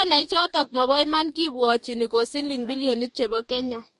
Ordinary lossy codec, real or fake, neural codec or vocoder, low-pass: MP3, 48 kbps; fake; codec, 44.1 kHz, 2.6 kbps, DAC; 14.4 kHz